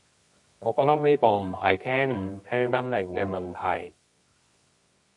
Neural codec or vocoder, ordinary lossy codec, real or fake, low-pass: codec, 24 kHz, 0.9 kbps, WavTokenizer, medium music audio release; MP3, 48 kbps; fake; 10.8 kHz